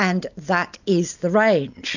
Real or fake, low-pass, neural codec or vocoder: real; 7.2 kHz; none